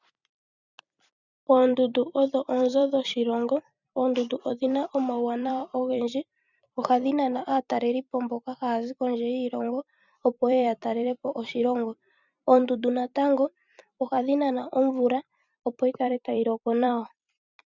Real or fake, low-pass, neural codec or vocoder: fake; 7.2 kHz; vocoder, 44.1 kHz, 128 mel bands every 256 samples, BigVGAN v2